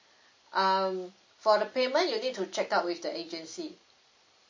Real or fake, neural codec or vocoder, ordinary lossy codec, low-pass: real; none; MP3, 32 kbps; 7.2 kHz